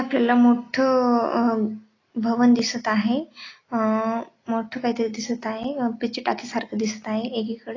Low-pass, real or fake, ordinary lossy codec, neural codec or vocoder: 7.2 kHz; real; AAC, 32 kbps; none